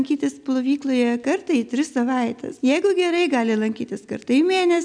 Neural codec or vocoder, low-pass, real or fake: none; 9.9 kHz; real